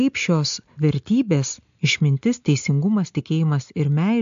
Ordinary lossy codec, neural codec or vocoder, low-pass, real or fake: AAC, 64 kbps; none; 7.2 kHz; real